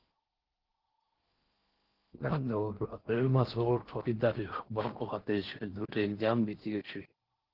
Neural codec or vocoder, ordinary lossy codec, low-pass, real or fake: codec, 16 kHz in and 24 kHz out, 0.6 kbps, FocalCodec, streaming, 4096 codes; Opus, 16 kbps; 5.4 kHz; fake